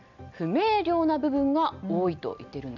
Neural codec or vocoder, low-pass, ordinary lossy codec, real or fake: none; 7.2 kHz; none; real